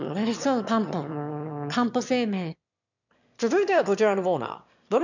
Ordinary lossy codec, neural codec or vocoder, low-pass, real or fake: none; autoencoder, 22.05 kHz, a latent of 192 numbers a frame, VITS, trained on one speaker; 7.2 kHz; fake